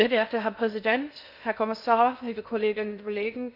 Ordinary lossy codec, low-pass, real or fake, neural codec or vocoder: none; 5.4 kHz; fake; codec, 16 kHz in and 24 kHz out, 0.6 kbps, FocalCodec, streaming, 2048 codes